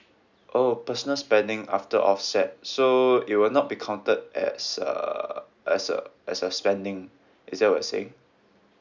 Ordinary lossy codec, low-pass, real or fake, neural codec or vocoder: none; 7.2 kHz; real; none